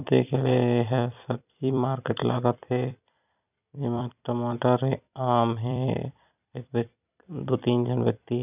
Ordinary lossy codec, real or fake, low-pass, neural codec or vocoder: none; fake; 3.6 kHz; vocoder, 44.1 kHz, 128 mel bands every 256 samples, BigVGAN v2